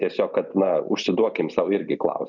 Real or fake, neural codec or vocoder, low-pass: real; none; 7.2 kHz